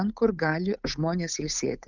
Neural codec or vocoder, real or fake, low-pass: codec, 16 kHz, 8 kbps, FunCodec, trained on Chinese and English, 25 frames a second; fake; 7.2 kHz